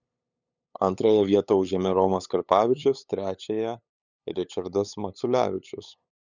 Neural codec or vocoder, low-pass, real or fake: codec, 16 kHz, 8 kbps, FunCodec, trained on LibriTTS, 25 frames a second; 7.2 kHz; fake